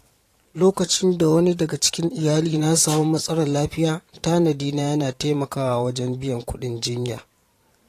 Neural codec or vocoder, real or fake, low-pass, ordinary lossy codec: vocoder, 44.1 kHz, 128 mel bands every 512 samples, BigVGAN v2; fake; 14.4 kHz; AAC, 48 kbps